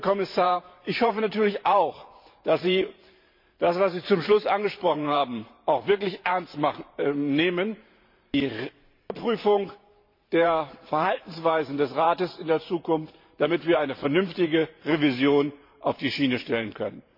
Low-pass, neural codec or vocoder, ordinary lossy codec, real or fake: 5.4 kHz; vocoder, 44.1 kHz, 128 mel bands every 512 samples, BigVGAN v2; MP3, 32 kbps; fake